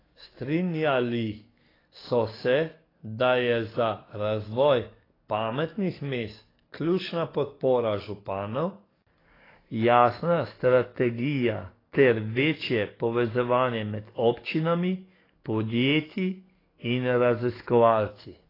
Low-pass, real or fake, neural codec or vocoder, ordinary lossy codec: 5.4 kHz; fake; codec, 44.1 kHz, 7.8 kbps, DAC; AAC, 24 kbps